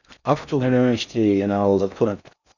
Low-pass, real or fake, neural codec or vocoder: 7.2 kHz; fake; codec, 16 kHz in and 24 kHz out, 0.6 kbps, FocalCodec, streaming, 4096 codes